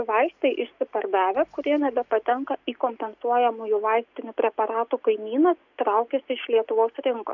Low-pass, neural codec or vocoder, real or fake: 7.2 kHz; none; real